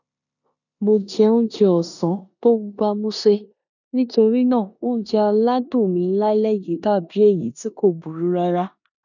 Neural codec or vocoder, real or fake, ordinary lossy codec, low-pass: codec, 16 kHz in and 24 kHz out, 0.9 kbps, LongCat-Audio-Codec, four codebook decoder; fake; none; 7.2 kHz